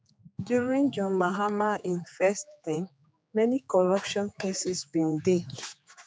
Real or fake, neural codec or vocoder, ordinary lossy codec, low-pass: fake; codec, 16 kHz, 4 kbps, X-Codec, HuBERT features, trained on general audio; none; none